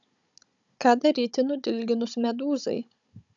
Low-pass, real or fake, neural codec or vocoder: 7.2 kHz; fake; codec, 16 kHz, 16 kbps, FunCodec, trained on Chinese and English, 50 frames a second